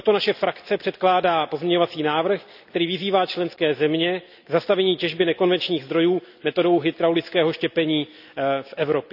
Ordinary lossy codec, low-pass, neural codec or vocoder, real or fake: none; 5.4 kHz; none; real